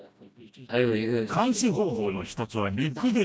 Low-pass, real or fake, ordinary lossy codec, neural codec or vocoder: none; fake; none; codec, 16 kHz, 1 kbps, FreqCodec, smaller model